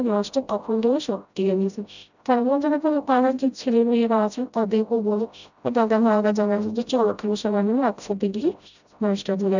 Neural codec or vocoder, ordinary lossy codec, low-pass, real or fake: codec, 16 kHz, 0.5 kbps, FreqCodec, smaller model; none; 7.2 kHz; fake